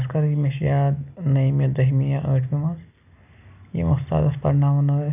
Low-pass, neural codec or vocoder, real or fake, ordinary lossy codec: 3.6 kHz; none; real; none